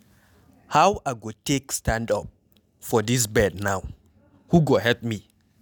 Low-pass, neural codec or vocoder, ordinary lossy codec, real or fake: none; none; none; real